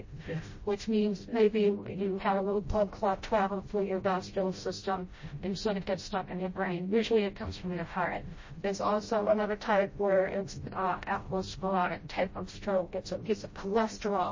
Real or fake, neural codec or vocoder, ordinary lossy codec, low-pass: fake; codec, 16 kHz, 0.5 kbps, FreqCodec, smaller model; MP3, 32 kbps; 7.2 kHz